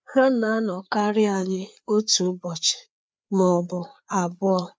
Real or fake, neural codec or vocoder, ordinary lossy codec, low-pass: fake; codec, 16 kHz, 4 kbps, FreqCodec, larger model; none; none